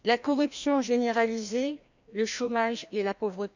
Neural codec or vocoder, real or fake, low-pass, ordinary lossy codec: codec, 16 kHz, 1 kbps, FreqCodec, larger model; fake; 7.2 kHz; none